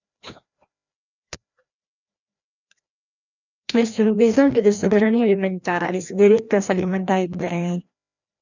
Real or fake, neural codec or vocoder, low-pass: fake; codec, 16 kHz, 1 kbps, FreqCodec, larger model; 7.2 kHz